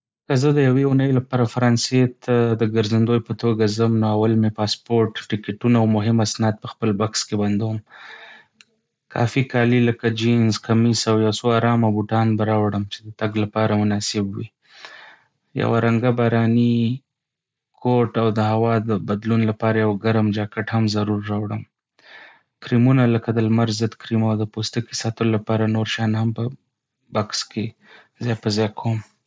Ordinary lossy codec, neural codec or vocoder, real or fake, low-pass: none; none; real; none